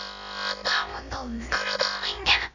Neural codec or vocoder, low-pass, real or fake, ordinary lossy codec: codec, 16 kHz, about 1 kbps, DyCAST, with the encoder's durations; 7.2 kHz; fake; none